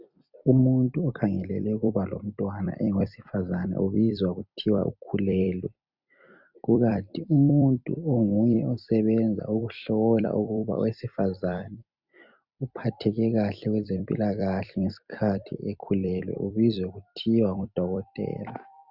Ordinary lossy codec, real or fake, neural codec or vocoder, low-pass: Opus, 64 kbps; fake; vocoder, 44.1 kHz, 128 mel bands every 256 samples, BigVGAN v2; 5.4 kHz